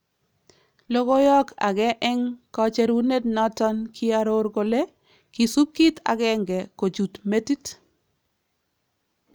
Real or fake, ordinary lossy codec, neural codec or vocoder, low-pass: real; none; none; none